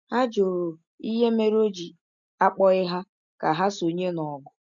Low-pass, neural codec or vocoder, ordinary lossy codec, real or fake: 7.2 kHz; none; none; real